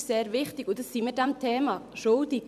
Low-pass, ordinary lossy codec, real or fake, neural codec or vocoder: 14.4 kHz; none; fake; vocoder, 44.1 kHz, 128 mel bands every 256 samples, BigVGAN v2